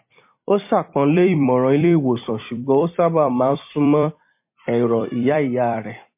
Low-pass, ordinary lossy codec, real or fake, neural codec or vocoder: 3.6 kHz; MP3, 24 kbps; fake; vocoder, 44.1 kHz, 128 mel bands every 256 samples, BigVGAN v2